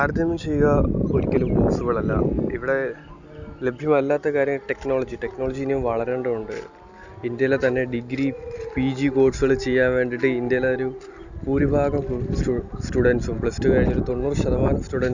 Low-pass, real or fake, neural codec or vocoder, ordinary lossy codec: 7.2 kHz; real; none; none